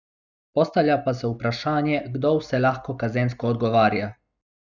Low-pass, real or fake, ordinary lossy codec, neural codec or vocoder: 7.2 kHz; real; none; none